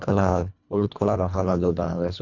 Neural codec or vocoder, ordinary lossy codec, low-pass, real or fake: codec, 24 kHz, 1.5 kbps, HILCodec; none; 7.2 kHz; fake